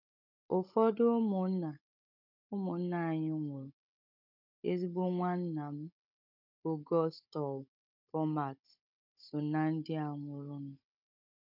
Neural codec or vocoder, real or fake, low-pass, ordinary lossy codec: codec, 16 kHz, 16 kbps, FunCodec, trained on Chinese and English, 50 frames a second; fake; 5.4 kHz; none